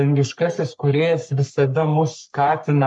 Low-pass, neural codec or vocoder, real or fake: 10.8 kHz; codec, 44.1 kHz, 3.4 kbps, Pupu-Codec; fake